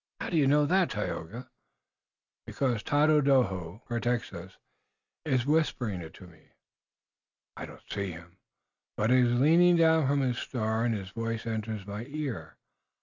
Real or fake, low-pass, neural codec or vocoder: real; 7.2 kHz; none